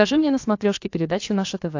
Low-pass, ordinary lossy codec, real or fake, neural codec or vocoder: 7.2 kHz; AAC, 48 kbps; fake; codec, 16 kHz, about 1 kbps, DyCAST, with the encoder's durations